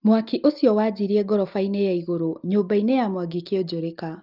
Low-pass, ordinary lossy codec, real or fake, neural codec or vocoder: 5.4 kHz; Opus, 32 kbps; real; none